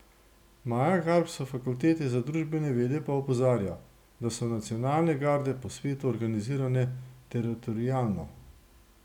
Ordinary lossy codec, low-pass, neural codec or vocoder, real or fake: none; 19.8 kHz; none; real